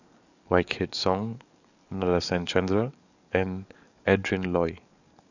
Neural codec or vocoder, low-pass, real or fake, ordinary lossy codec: codec, 44.1 kHz, 7.8 kbps, DAC; 7.2 kHz; fake; none